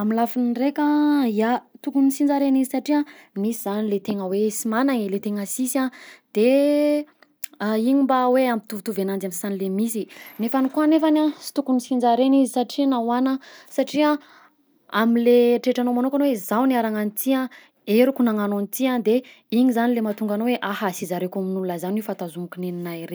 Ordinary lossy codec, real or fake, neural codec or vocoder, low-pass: none; real; none; none